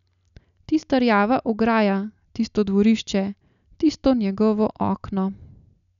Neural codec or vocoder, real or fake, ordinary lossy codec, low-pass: none; real; none; 7.2 kHz